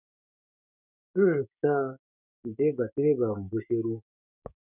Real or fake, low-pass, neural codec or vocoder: fake; 3.6 kHz; codec, 16 kHz, 6 kbps, DAC